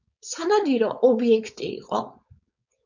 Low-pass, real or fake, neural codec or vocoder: 7.2 kHz; fake; codec, 16 kHz, 4.8 kbps, FACodec